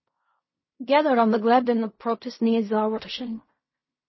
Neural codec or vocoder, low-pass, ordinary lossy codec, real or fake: codec, 16 kHz in and 24 kHz out, 0.4 kbps, LongCat-Audio-Codec, fine tuned four codebook decoder; 7.2 kHz; MP3, 24 kbps; fake